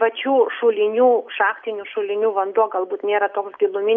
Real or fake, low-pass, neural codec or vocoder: real; 7.2 kHz; none